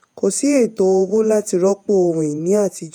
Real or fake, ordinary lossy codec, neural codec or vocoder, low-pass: fake; none; vocoder, 48 kHz, 128 mel bands, Vocos; 19.8 kHz